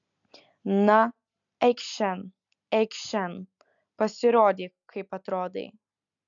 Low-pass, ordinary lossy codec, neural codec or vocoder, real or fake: 7.2 kHz; MP3, 96 kbps; none; real